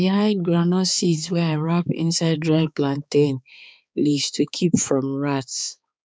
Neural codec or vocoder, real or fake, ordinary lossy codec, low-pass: codec, 16 kHz, 4 kbps, X-Codec, HuBERT features, trained on balanced general audio; fake; none; none